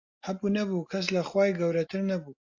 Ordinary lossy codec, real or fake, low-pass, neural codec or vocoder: AAC, 48 kbps; real; 7.2 kHz; none